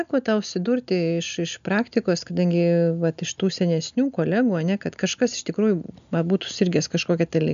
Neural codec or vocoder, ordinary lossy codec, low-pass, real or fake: none; MP3, 96 kbps; 7.2 kHz; real